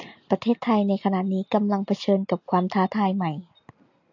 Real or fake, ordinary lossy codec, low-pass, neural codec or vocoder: real; AAC, 48 kbps; 7.2 kHz; none